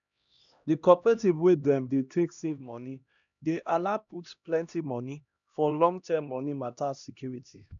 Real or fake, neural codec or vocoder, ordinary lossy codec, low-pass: fake; codec, 16 kHz, 1 kbps, X-Codec, HuBERT features, trained on LibriSpeech; none; 7.2 kHz